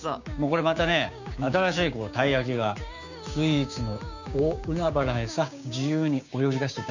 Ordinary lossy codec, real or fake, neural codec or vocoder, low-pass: none; fake; codec, 16 kHz, 6 kbps, DAC; 7.2 kHz